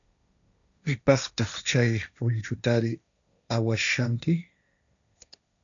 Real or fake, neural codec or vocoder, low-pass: fake; codec, 16 kHz, 1.1 kbps, Voila-Tokenizer; 7.2 kHz